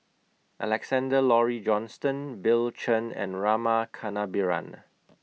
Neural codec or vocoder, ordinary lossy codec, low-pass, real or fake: none; none; none; real